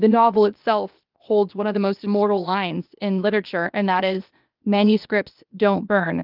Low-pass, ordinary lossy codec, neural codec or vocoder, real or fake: 5.4 kHz; Opus, 24 kbps; codec, 16 kHz, 0.8 kbps, ZipCodec; fake